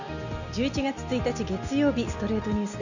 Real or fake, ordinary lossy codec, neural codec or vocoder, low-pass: real; none; none; 7.2 kHz